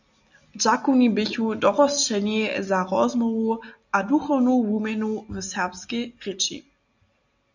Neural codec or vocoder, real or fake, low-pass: none; real; 7.2 kHz